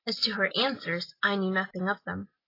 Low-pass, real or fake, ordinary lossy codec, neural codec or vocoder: 5.4 kHz; real; AAC, 24 kbps; none